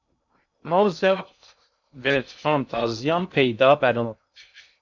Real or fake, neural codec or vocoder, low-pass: fake; codec, 16 kHz in and 24 kHz out, 0.6 kbps, FocalCodec, streaming, 2048 codes; 7.2 kHz